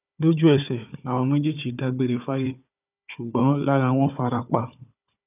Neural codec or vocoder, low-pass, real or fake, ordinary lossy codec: codec, 16 kHz, 4 kbps, FunCodec, trained on Chinese and English, 50 frames a second; 3.6 kHz; fake; none